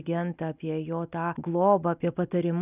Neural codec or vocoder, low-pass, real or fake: none; 3.6 kHz; real